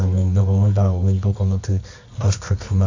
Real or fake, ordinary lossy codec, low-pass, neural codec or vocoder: fake; AAC, 48 kbps; 7.2 kHz; codec, 24 kHz, 0.9 kbps, WavTokenizer, medium music audio release